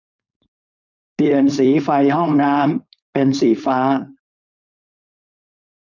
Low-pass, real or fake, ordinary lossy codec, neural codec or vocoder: 7.2 kHz; fake; none; codec, 16 kHz, 4.8 kbps, FACodec